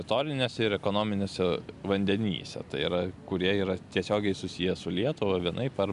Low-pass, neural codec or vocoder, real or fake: 10.8 kHz; none; real